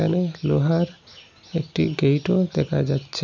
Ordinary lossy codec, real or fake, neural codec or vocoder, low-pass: none; real; none; 7.2 kHz